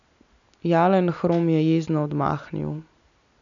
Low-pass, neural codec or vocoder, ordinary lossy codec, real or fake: 7.2 kHz; none; none; real